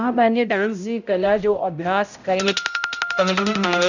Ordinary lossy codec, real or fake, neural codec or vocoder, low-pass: none; fake; codec, 16 kHz, 0.5 kbps, X-Codec, HuBERT features, trained on balanced general audio; 7.2 kHz